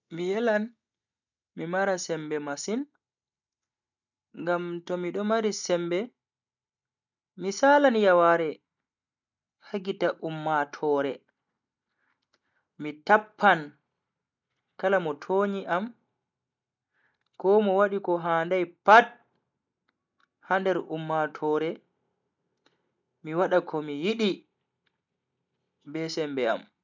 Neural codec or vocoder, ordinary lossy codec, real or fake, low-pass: none; none; real; 7.2 kHz